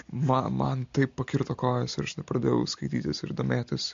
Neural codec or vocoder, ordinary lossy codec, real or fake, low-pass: none; MP3, 48 kbps; real; 7.2 kHz